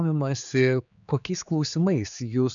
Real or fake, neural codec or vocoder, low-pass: fake; codec, 16 kHz, 4 kbps, X-Codec, HuBERT features, trained on general audio; 7.2 kHz